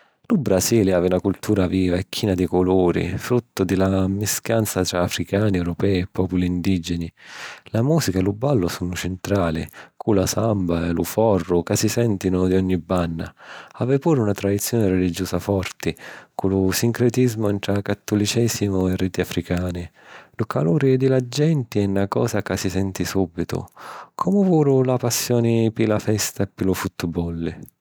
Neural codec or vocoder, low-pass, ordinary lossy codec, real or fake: none; none; none; real